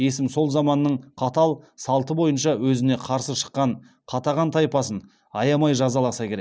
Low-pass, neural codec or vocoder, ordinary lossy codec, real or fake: none; none; none; real